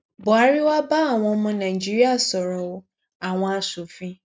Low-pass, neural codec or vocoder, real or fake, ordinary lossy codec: none; none; real; none